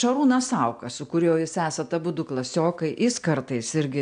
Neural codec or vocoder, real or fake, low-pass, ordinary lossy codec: none; real; 9.9 kHz; AAC, 96 kbps